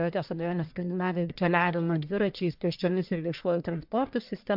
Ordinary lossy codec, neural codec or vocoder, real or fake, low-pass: MP3, 48 kbps; codec, 44.1 kHz, 1.7 kbps, Pupu-Codec; fake; 5.4 kHz